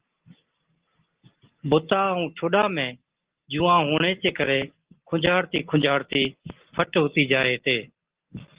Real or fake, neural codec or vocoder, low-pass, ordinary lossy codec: real; none; 3.6 kHz; Opus, 16 kbps